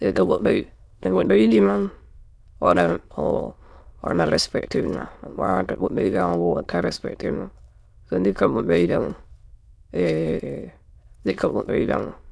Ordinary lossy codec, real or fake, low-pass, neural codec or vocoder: none; fake; none; autoencoder, 22.05 kHz, a latent of 192 numbers a frame, VITS, trained on many speakers